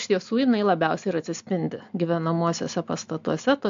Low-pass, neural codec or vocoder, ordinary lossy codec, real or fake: 7.2 kHz; none; AAC, 64 kbps; real